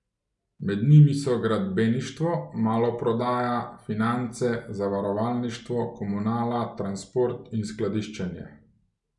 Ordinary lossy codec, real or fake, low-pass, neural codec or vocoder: none; real; 10.8 kHz; none